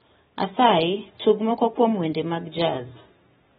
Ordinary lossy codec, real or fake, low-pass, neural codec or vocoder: AAC, 16 kbps; real; 10.8 kHz; none